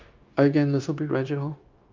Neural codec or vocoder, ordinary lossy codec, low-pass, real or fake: codec, 16 kHz, 0.9 kbps, LongCat-Audio-Codec; Opus, 24 kbps; 7.2 kHz; fake